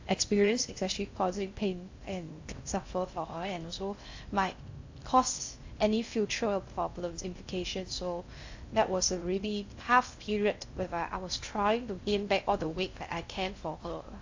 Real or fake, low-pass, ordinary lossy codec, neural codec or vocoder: fake; 7.2 kHz; AAC, 48 kbps; codec, 16 kHz in and 24 kHz out, 0.6 kbps, FocalCodec, streaming, 2048 codes